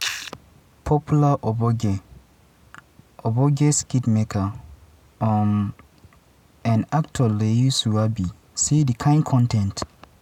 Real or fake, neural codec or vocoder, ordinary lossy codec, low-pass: real; none; none; 19.8 kHz